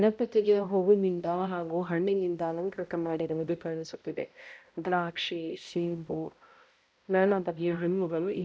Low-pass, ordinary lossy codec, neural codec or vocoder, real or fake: none; none; codec, 16 kHz, 0.5 kbps, X-Codec, HuBERT features, trained on balanced general audio; fake